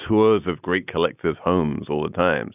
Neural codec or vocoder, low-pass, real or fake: none; 3.6 kHz; real